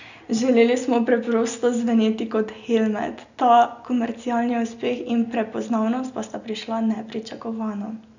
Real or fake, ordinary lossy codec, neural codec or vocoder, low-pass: real; none; none; 7.2 kHz